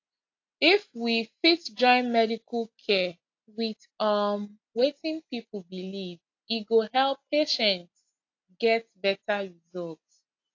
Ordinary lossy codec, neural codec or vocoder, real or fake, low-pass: AAC, 48 kbps; none; real; 7.2 kHz